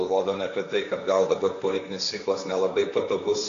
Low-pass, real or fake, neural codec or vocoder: 7.2 kHz; fake; codec, 16 kHz, 1.1 kbps, Voila-Tokenizer